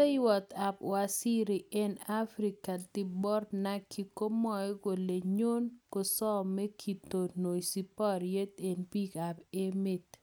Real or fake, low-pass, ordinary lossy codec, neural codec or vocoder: real; none; none; none